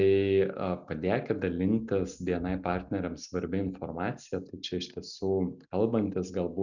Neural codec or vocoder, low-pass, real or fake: none; 7.2 kHz; real